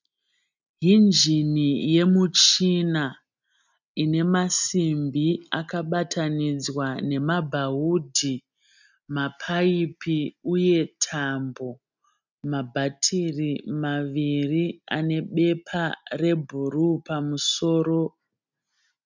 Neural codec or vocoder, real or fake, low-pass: none; real; 7.2 kHz